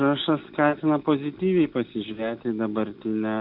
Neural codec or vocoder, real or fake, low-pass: none; real; 5.4 kHz